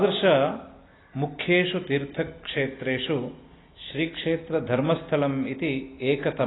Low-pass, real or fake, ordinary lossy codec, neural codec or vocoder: 7.2 kHz; real; AAC, 16 kbps; none